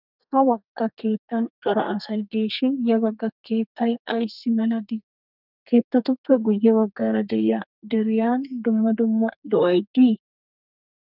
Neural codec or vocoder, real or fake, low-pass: codec, 32 kHz, 1.9 kbps, SNAC; fake; 5.4 kHz